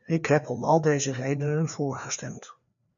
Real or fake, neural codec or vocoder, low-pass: fake; codec, 16 kHz, 2 kbps, FreqCodec, larger model; 7.2 kHz